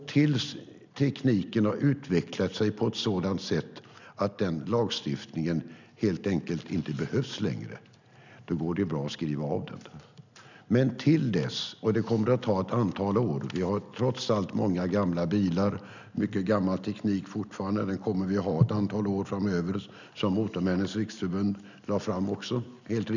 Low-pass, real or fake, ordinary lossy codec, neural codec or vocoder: 7.2 kHz; real; none; none